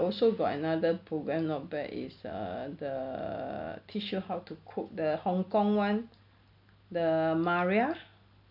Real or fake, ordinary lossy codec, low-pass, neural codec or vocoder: real; none; 5.4 kHz; none